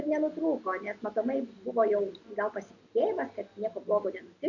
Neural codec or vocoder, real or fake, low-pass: none; real; 7.2 kHz